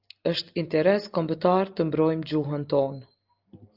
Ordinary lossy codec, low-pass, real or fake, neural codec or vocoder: Opus, 24 kbps; 5.4 kHz; real; none